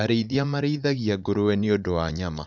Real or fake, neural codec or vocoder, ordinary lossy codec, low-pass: fake; vocoder, 44.1 kHz, 80 mel bands, Vocos; none; 7.2 kHz